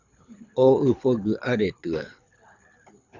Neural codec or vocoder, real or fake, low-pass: codec, 24 kHz, 6 kbps, HILCodec; fake; 7.2 kHz